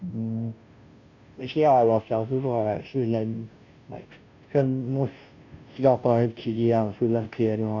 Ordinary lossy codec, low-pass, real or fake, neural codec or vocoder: none; 7.2 kHz; fake; codec, 16 kHz, 0.5 kbps, FunCodec, trained on Chinese and English, 25 frames a second